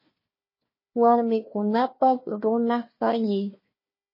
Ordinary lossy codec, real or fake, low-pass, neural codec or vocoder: MP3, 24 kbps; fake; 5.4 kHz; codec, 16 kHz, 1 kbps, FunCodec, trained on Chinese and English, 50 frames a second